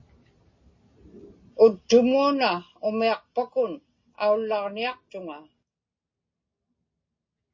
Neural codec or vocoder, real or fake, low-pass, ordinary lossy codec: none; real; 7.2 kHz; MP3, 32 kbps